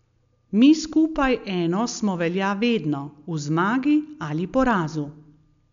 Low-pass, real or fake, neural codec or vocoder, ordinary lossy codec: 7.2 kHz; real; none; none